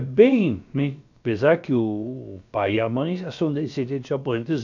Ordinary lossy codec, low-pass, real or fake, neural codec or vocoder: none; 7.2 kHz; fake; codec, 16 kHz, about 1 kbps, DyCAST, with the encoder's durations